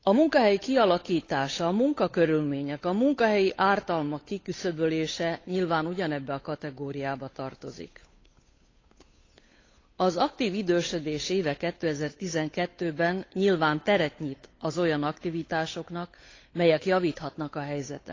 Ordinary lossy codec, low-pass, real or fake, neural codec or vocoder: AAC, 32 kbps; 7.2 kHz; fake; codec, 16 kHz, 8 kbps, FunCodec, trained on Chinese and English, 25 frames a second